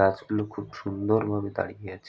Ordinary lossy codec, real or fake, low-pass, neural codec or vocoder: none; real; none; none